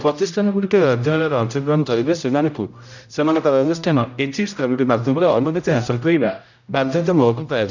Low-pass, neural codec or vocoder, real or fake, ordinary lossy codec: 7.2 kHz; codec, 16 kHz, 0.5 kbps, X-Codec, HuBERT features, trained on general audio; fake; none